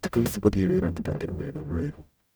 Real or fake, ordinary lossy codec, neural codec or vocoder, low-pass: fake; none; codec, 44.1 kHz, 0.9 kbps, DAC; none